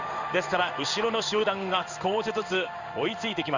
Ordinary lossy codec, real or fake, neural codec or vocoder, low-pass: Opus, 64 kbps; fake; codec, 16 kHz in and 24 kHz out, 1 kbps, XY-Tokenizer; 7.2 kHz